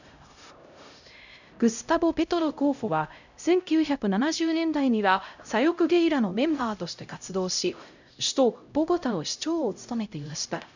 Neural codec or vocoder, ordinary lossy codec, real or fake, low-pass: codec, 16 kHz, 0.5 kbps, X-Codec, HuBERT features, trained on LibriSpeech; none; fake; 7.2 kHz